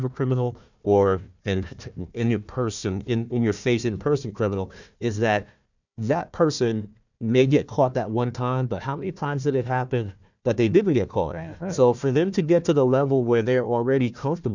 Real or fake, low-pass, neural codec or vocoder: fake; 7.2 kHz; codec, 16 kHz, 1 kbps, FunCodec, trained on Chinese and English, 50 frames a second